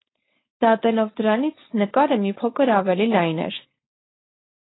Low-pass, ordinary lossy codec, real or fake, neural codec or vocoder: 7.2 kHz; AAC, 16 kbps; fake; codec, 16 kHz, 4.8 kbps, FACodec